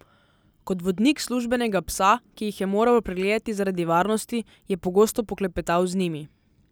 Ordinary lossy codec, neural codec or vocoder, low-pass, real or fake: none; none; none; real